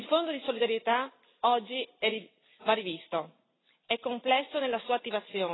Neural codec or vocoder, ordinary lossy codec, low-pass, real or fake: none; AAC, 16 kbps; 7.2 kHz; real